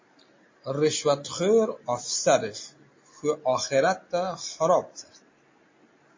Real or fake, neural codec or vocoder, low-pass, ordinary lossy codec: real; none; 7.2 kHz; MP3, 32 kbps